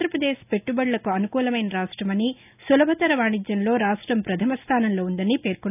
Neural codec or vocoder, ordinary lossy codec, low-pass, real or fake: none; none; 3.6 kHz; real